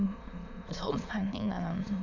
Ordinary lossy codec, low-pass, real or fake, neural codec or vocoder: none; 7.2 kHz; fake; autoencoder, 22.05 kHz, a latent of 192 numbers a frame, VITS, trained on many speakers